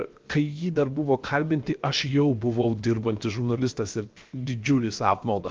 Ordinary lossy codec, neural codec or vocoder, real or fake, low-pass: Opus, 24 kbps; codec, 16 kHz, 0.7 kbps, FocalCodec; fake; 7.2 kHz